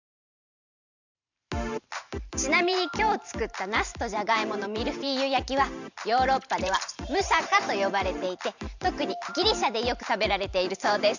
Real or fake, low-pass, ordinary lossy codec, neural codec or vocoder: fake; 7.2 kHz; none; vocoder, 44.1 kHz, 128 mel bands every 512 samples, BigVGAN v2